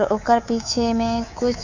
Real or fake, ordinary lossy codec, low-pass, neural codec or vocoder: fake; none; 7.2 kHz; codec, 24 kHz, 3.1 kbps, DualCodec